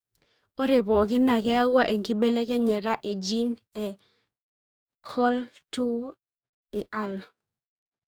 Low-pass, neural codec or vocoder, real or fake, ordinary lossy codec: none; codec, 44.1 kHz, 2.6 kbps, DAC; fake; none